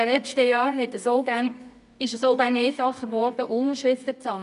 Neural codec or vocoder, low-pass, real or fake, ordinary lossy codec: codec, 24 kHz, 0.9 kbps, WavTokenizer, medium music audio release; 10.8 kHz; fake; none